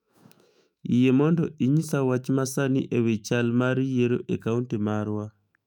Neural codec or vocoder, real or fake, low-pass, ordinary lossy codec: autoencoder, 48 kHz, 128 numbers a frame, DAC-VAE, trained on Japanese speech; fake; 19.8 kHz; none